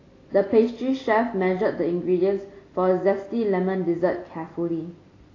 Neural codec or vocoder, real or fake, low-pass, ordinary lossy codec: none; real; 7.2 kHz; AAC, 32 kbps